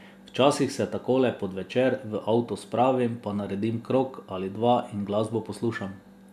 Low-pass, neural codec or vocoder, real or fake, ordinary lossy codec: 14.4 kHz; none; real; none